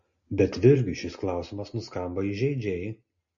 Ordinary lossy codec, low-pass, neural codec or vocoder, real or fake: MP3, 32 kbps; 7.2 kHz; none; real